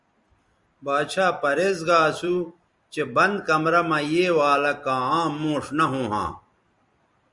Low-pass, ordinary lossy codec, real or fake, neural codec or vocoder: 10.8 kHz; Opus, 64 kbps; fake; vocoder, 44.1 kHz, 128 mel bands every 256 samples, BigVGAN v2